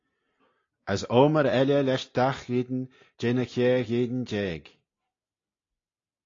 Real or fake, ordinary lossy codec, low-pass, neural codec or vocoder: real; AAC, 32 kbps; 7.2 kHz; none